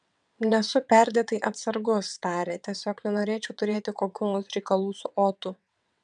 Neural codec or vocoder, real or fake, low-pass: vocoder, 22.05 kHz, 80 mel bands, WaveNeXt; fake; 9.9 kHz